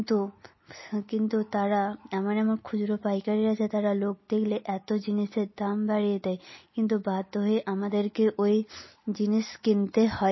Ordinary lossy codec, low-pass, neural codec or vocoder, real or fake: MP3, 24 kbps; 7.2 kHz; none; real